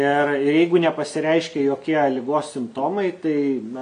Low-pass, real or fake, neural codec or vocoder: 9.9 kHz; real; none